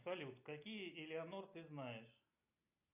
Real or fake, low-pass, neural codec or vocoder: real; 3.6 kHz; none